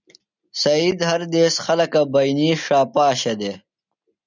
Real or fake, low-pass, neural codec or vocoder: real; 7.2 kHz; none